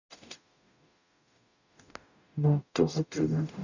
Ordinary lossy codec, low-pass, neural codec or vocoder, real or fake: none; 7.2 kHz; codec, 44.1 kHz, 0.9 kbps, DAC; fake